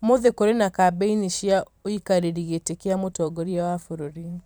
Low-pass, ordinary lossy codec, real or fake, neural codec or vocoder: none; none; fake; vocoder, 44.1 kHz, 128 mel bands every 512 samples, BigVGAN v2